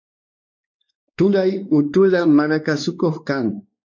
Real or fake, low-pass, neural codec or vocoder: fake; 7.2 kHz; codec, 16 kHz, 2 kbps, X-Codec, WavLM features, trained on Multilingual LibriSpeech